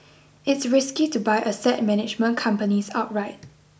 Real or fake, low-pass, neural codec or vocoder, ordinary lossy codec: real; none; none; none